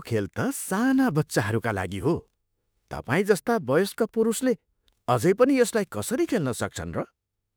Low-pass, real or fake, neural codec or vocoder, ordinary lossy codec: none; fake; autoencoder, 48 kHz, 32 numbers a frame, DAC-VAE, trained on Japanese speech; none